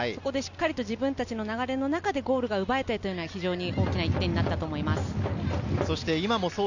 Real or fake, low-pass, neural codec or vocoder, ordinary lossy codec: real; 7.2 kHz; none; none